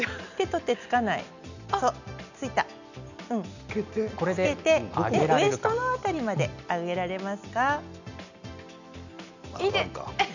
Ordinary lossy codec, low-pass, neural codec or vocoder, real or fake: none; 7.2 kHz; none; real